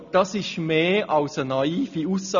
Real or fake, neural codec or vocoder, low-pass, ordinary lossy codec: real; none; 7.2 kHz; none